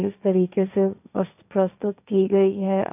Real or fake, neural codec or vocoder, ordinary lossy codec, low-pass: fake; codec, 16 kHz, 1.1 kbps, Voila-Tokenizer; none; 3.6 kHz